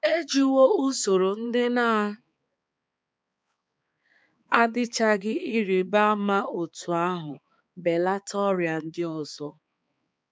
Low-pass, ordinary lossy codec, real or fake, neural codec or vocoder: none; none; fake; codec, 16 kHz, 4 kbps, X-Codec, HuBERT features, trained on balanced general audio